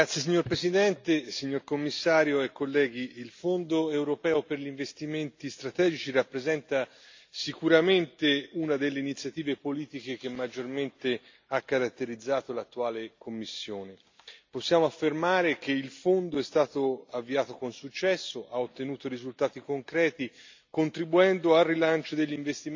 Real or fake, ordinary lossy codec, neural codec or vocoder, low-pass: real; MP3, 64 kbps; none; 7.2 kHz